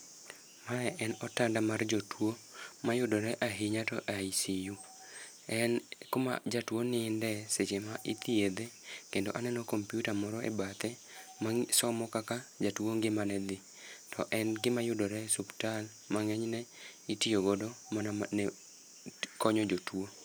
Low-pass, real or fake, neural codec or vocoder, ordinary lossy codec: none; real; none; none